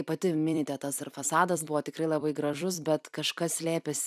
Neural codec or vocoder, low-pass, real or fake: vocoder, 44.1 kHz, 128 mel bands every 256 samples, BigVGAN v2; 14.4 kHz; fake